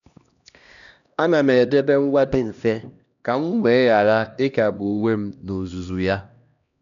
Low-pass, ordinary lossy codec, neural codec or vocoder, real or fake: 7.2 kHz; none; codec, 16 kHz, 1 kbps, X-Codec, HuBERT features, trained on LibriSpeech; fake